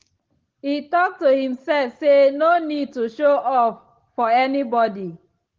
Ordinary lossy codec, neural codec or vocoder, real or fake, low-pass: Opus, 16 kbps; none; real; 7.2 kHz